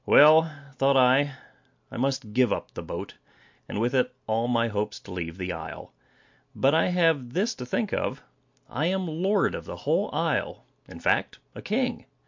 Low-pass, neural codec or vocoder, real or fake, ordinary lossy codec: 7.2 kHz; none; real; MP3, 48 kbps